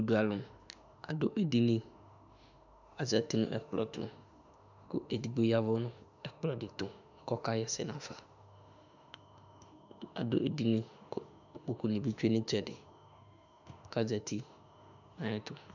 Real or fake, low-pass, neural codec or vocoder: fake; 7.2 kHz; autoencoder, 48 kHz, 32 numbers a frame, DAC-VAE, trained on Japanese speech